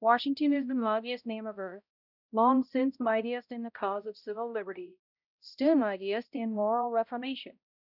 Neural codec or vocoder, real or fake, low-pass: codec, 16 kHz, 0.5 kbps, X-Codec, HuBERT features, trained on balanced general audio; fake; 5.4 kHz